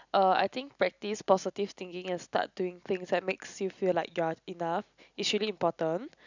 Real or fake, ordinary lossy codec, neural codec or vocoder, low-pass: real; none; none; 7.2 kHz